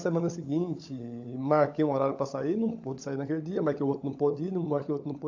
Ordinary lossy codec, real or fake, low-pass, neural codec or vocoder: AAC, 48 kbps; fake; 7.2 kHz; codec, 16 kHz, 16 kbps, FunCodec, trained on LibriTTS, 50 frames a second